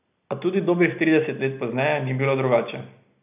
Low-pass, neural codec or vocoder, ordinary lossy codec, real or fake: 3.6 kHz; none; none; real